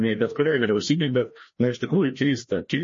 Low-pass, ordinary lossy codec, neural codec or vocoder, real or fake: 7.2 kHz; MP3, 32 kbps; codec, 16 kHz, 1 kbps, FreqCodec, larger model; fake